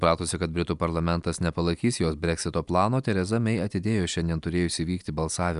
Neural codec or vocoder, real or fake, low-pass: none; real; 10.8 kHz